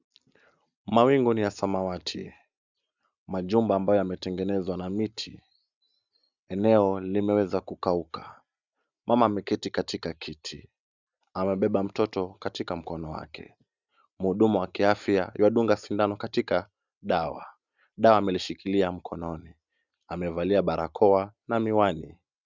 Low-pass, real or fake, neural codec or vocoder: 7.2 kHz; real; none